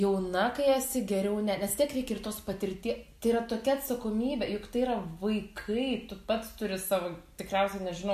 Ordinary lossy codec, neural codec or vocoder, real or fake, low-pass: MP3, 64 kbps; none; real; 14.4 kHz